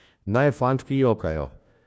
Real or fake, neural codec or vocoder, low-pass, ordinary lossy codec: fake; codec, 16 kHz, 1 kbps, FunCodec, trained on LibriTTS, 50 frames a second; none; none